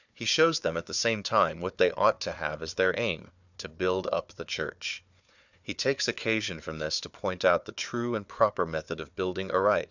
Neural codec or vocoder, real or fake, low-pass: codec, 16 kHz, 4 kbps, FunCodec, trained on Chinese and English, 50 frames a second; fake; 7.2 kHz